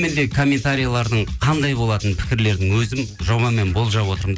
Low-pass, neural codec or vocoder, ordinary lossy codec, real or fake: none; none; none; real